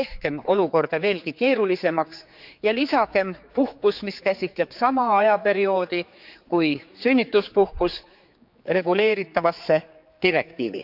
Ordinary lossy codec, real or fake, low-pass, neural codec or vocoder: none; fake; 5.4 kHz; codec, 16 kHz, 4 kbps, X-Codec, HuBERT features, trained on general audio